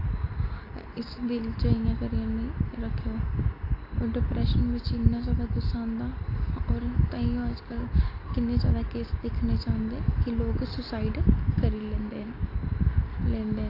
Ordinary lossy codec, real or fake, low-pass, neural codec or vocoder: AAC, 32 kbps; real; 5.4 kHz; none